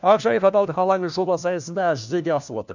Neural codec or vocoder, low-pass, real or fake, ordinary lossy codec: codec, 16 kHz, 1 kbps, FunCodec, trained on LibriTTS, 50 frames a second; 7.2 kHz; fake; none